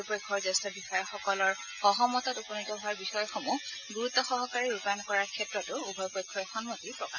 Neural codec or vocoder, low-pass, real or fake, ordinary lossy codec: none; 7.2 kHz; real; none